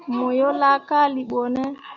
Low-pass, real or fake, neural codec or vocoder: 7.2 kHz; real; none